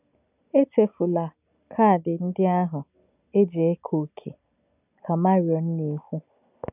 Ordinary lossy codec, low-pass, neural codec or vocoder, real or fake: none; 3.6 kHz; none; real